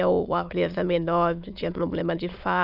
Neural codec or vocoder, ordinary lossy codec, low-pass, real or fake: autoencoder, 22.05 kHz, a latent of 192 numbers a frame, VITS, trained on many speakers; none; 5.4 kHz; fake